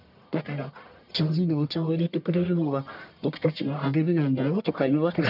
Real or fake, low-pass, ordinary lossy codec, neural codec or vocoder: fake; 5.4 kHz; none; codec, 44.1 kHz, 1.7 kbps, Pupu-Codec